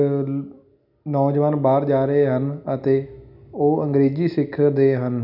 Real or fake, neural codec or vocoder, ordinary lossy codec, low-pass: real; none; none; 5.4 kHz